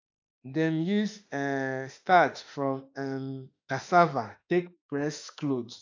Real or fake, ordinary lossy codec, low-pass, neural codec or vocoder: fake; AAC, 48 kbps; 7.2 kHz; autoencoder, 48 kHz, 32 numbers a frame, DAC-VAE, trained on Japanese speech